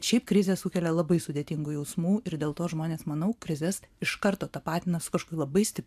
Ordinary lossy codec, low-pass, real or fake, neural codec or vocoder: AAC, 96 kbps; 14.4 kHz; fake; vocoder, 44.1 kHz, 128 mel bands every 512 samples, BigVGAN v2